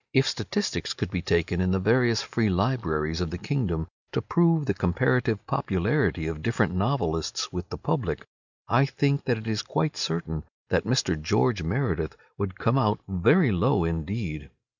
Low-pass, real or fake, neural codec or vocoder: 7.2 kHz; real; none